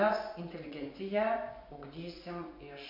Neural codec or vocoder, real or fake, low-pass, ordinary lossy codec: vocoder, 24 kHz, 100 mel bands, Vocos; fake; 5.4 kHz; MP3, 32 kbps